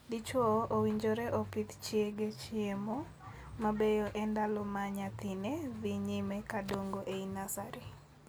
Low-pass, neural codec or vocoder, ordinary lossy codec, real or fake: none; none; none; real